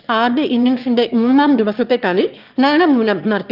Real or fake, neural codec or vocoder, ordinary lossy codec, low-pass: fake; autoencoder, 22.05 kHz, a latent of 192 numbers a frame, VITS, trained on one speaker; Opus, 32 kbps; 5.4 kHz